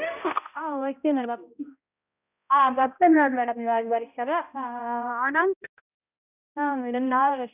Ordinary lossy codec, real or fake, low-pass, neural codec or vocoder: none; fake; 3.6 kHz; codec, 16 kHz, 0.5 kbps, X-Codec, HuBERT features, trained on balanced general audio